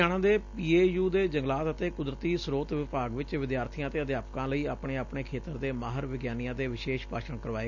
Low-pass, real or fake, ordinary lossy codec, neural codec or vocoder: 7.2 kHz; real; none; none